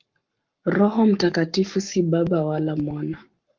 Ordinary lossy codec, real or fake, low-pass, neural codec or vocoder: Opus, 24 kbps; fake; 7.2 kHz; codec, 44.1 kHz, 7.8 kbps, Pupu-Codec